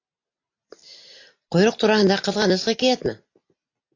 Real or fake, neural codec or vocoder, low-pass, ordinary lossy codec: real; none; 7.2 kHz; AAC, 48 kbps